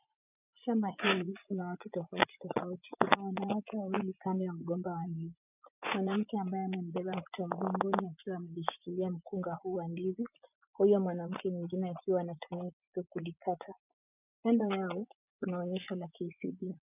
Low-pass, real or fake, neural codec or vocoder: 3.6 kHz; fake; vocoder, 24 kHz, 100 mel bands, Vocos